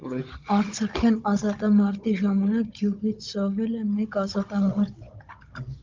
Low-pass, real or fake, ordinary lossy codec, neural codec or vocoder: 7.2 kHz; fake; Opus, 24 kbps; codec, 16 kHz, 16 kbps, FunCodec, trained on Chinese and English, 50 frames a second